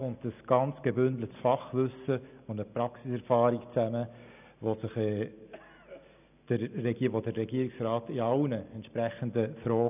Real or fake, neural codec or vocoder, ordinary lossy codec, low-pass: real; none; none; 3.6 kHz